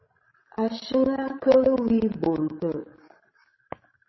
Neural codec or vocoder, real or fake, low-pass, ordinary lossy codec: codec, 16 kHz, 16 kbps, FreqCodec, larger model; fake; 7.2 kHz; MP3, 24 kbps